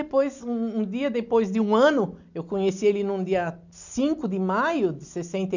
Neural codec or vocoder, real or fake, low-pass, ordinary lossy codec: none; real; 7.2 kHz; none